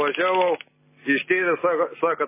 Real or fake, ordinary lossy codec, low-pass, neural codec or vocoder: real; MP3, 16 kbps; 3.6 kHz; none